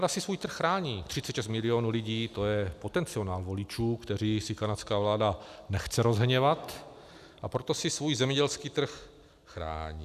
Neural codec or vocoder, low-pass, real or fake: none; 14.4 kHz; real